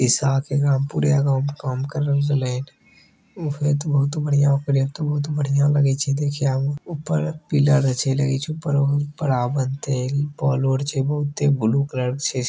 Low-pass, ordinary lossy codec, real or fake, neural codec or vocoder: none; none; real; none